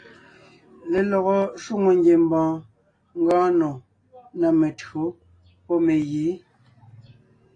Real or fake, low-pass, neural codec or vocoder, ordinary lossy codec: real; 9.9 kHz; none; AAC, 32 kbps